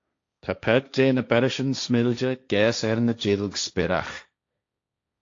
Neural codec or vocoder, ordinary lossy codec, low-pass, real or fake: codec, 16 kHz, 1.1 kbps, Voila-Tokenizer; AAC, 48 kbps; 7.2 kHz; fake